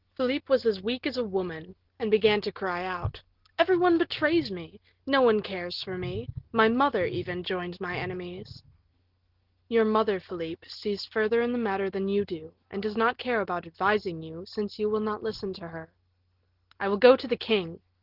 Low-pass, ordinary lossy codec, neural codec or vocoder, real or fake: 5.4 kHz; Opus, 16 kbps; none; real